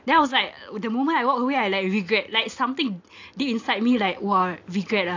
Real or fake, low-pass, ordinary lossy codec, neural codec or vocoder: real; 7.2 kHz; AAC, 48 kbps; none